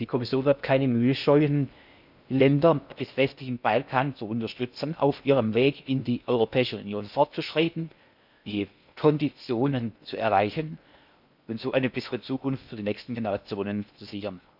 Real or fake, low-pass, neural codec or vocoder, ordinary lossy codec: fake; 5.4 kHz; codec, 16 kHz in and 24 kHz out, 0.6 kbps, FocalCodec, streaming, 2048 codes; none